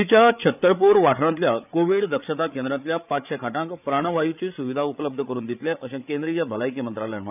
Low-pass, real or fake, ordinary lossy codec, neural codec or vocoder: 3.6 kHz; fake; none; codec, 16 kHz, 8 kbps, FreqCodec, larger model